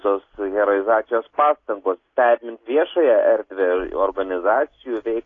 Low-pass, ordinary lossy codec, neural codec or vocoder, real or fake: 7.2 kHz; AAC, 32 kbps; none; real